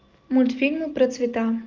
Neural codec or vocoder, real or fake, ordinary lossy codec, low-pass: none; real; Opus, 32 kbps; 7.2 kHz